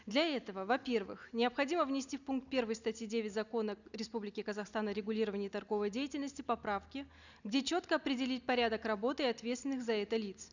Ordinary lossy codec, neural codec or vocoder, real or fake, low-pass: none; none; real; 7.2 kHz